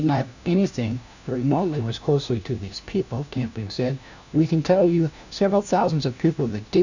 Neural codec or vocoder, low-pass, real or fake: codec, 16 kHz, 1 kbps, FunCodec, trained on LibriTTS, 50 frames a second; 7.2 kHz; fake